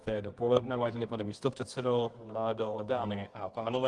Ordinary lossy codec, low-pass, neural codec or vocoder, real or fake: Opus, 24 kbps; 10.8 kHz; codec, 24 kHz, 0.9 kbps, WavTokenizer, medium music audio release; fake